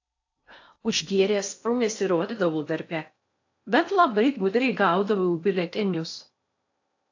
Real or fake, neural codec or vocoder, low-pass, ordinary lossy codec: fake; codec, 16 kHz in and 24 kHz out, 0.6 kbps, FocalCodec, streaming, 4096 codes; 7.2 kHz; AAC, 48 kbps